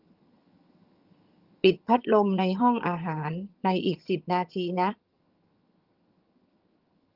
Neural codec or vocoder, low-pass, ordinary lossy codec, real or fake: vocoder, 22.05 kHz, 80 mel bands, HiFi-GAN; 5.4 kHz; Opus, 32 kbps; fake